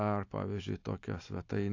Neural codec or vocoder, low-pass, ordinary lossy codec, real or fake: none; 7.2 kHz; AAC, 48 kbps; real